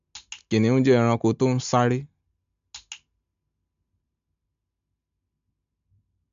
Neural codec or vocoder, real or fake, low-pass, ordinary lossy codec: none; real; 7.2 kHz; MP3, 64 kbps